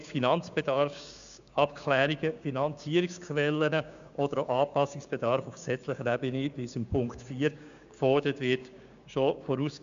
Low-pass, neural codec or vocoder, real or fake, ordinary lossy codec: 7.2 kHz; codec, 16 kHz, 6 kbps, DAC; fake; MP3, 64 kbps